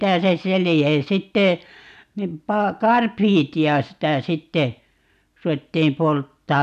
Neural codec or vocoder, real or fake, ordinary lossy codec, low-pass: none; real; none; 14.4 kHz